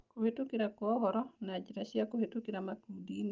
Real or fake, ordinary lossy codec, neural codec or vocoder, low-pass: real; Opus, 24 kbps; none; 7.2 kHz